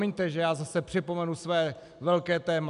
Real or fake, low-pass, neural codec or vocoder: real; 10.8 kHz; none